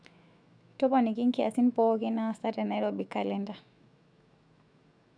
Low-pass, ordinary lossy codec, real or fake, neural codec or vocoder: 9.9 kHz; none; fake; autoencoder, 48 kHz, 128 numbers a frame, DAC-VAE, trained on Japanese speech